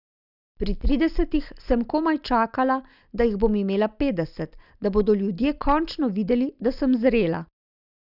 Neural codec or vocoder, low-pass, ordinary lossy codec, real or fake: none; 5.4 kHz; none; real